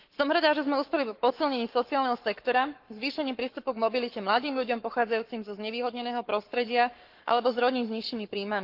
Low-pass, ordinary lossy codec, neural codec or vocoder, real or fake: 5.4 kHz; Opus, 32 kbps; codec, 44.1 kHz, 7.8 kbps, Pupu-Codec; fake